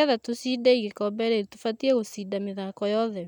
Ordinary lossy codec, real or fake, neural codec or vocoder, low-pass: none; real; none; 19.8 kHz